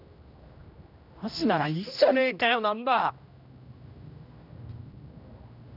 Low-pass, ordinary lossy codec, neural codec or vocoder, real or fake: 5.4 kHz; none; codec, 16 kHz, 1 kbps, X-Codec, HuBERT features, trained on general audio; fake